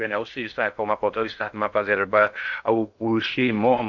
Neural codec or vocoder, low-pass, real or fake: codec, 16 kHz in and 24 kHz out, 0.6 kbps, FocalCodec, streaming, 2048 codes; 7.2 kHz; fake